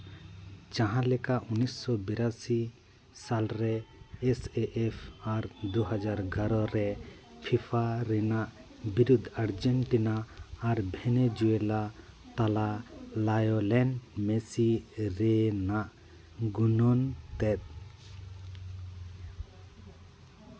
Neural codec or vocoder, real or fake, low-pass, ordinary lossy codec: none; real; none; none